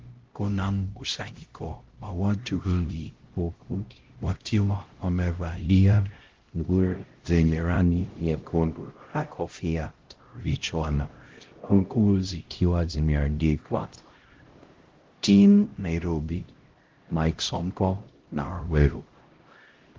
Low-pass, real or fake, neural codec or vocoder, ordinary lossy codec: 7.2 kHz; fake; codec, 16 kHz, 0.5 kbps, X-Codec, HuBERT features, trained on LibriSpeech; Opus, 16 kbps